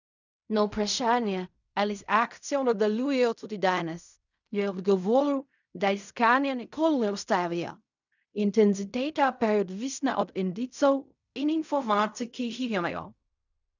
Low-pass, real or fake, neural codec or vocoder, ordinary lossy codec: 7.2 kHz; fake; codec, 16 kHz in and 24 kHz out, 0.4 kbps, LongCat-Audio-Codec, fine tuned four codebook decoder; none